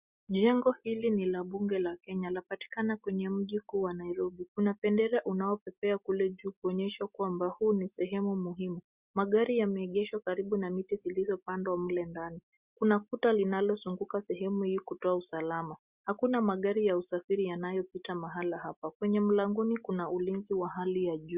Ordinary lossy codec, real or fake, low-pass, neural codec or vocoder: Opus, 64 kbps; real; 3.6 kHz; none